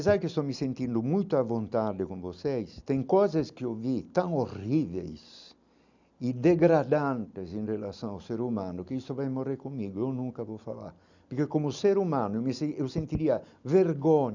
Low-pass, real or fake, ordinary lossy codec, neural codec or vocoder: 7.2 kHz; real; none; none